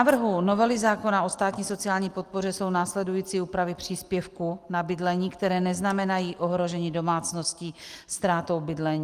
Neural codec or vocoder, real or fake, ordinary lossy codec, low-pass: none; real; Opus, 24 kbps; 14.4 kHz